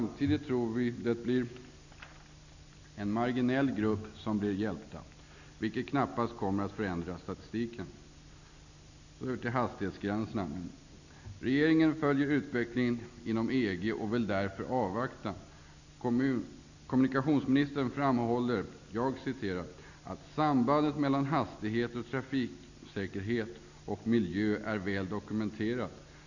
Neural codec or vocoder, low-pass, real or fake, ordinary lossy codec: none; 7.2 kHz; real; none